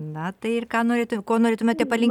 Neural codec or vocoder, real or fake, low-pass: none; real; 19.8 kHz